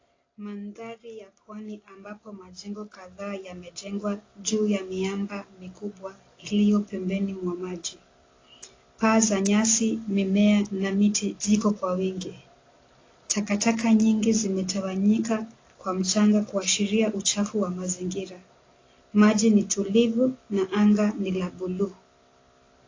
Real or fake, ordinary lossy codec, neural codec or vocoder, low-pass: real; AAC, 32 kbps; none; 7.2 kHz